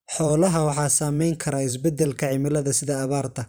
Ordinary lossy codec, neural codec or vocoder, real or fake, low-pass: none; vocoder, 44.1 kHz, 128 mel bands every 512 samples, BigVGAN v2; fake; none